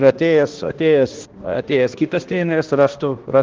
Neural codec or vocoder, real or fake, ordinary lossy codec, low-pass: codec, 16 kHz, 1 kbps, X-Codec, HuBERT features, trained on balanced general audio; fake; Opus, 16 kbps; 7.2 kHz